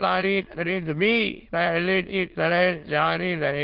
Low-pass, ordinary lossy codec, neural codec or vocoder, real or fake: 5.4 kHz; Opus, 16 kbps; autoencoder, 22.05 kHz, a latent of 192 numbers a frame, VITS, trained on many speakers; fake